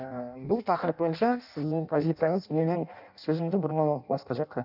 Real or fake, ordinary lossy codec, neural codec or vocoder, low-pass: fake; none; codec, 16 kHz in and 24 kHz out, 0.6 kbps, FireRedTTS-2 codec; 5.4 kHz